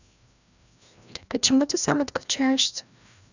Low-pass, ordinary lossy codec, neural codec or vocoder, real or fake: 7.2 kHz; none; codec, 16 kHz, 1 kbps, FreqCodec, larger model; fake